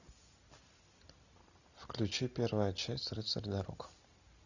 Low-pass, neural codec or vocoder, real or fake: 7.2 kHz; none; real